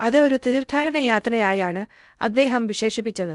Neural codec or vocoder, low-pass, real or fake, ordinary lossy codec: codec, 16 kHz in and 24 kHz out, 0.6 kbps, FocalCodec, streaming, 2048 codes; 10.8 kHz; fake; none